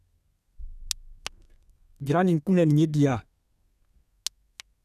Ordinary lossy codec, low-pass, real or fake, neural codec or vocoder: none; 14.4 kHz; fake; codec, 32 kHz, 1.9 kbps, SNAC